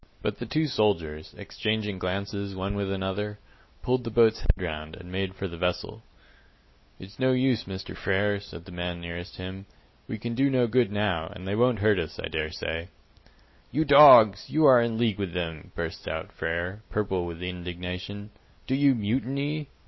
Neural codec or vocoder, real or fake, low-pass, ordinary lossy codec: none; real; 7.2 kHz; MP3, 24 kbps